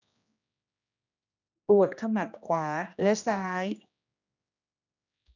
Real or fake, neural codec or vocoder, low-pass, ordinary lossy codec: fake; codec, 16 kHz, 1 kbps, X-Codec, HuBERT features, trained on general audio; 7.2 kHz; none